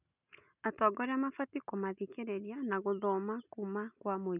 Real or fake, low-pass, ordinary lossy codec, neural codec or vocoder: real; 3.6 kHz; none; none